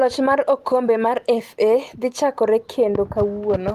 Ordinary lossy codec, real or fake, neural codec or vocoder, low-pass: Opus, 24 kbps; real; none; 14.4 kHz